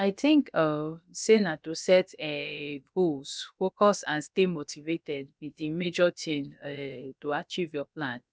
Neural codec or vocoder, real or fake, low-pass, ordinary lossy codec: codec, 16 kHz, about 1 kbps, DyCAST, with the encoder's durations; fake; none; none